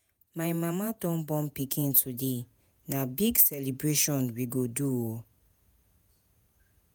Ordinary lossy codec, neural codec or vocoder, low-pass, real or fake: none; vocoder, 48 kHz, 128 mel bands, Vocos; none; fake